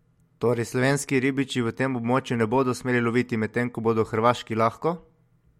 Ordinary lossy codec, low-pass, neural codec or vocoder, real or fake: MP3, 64 kbps; 19.8 kHz; none; real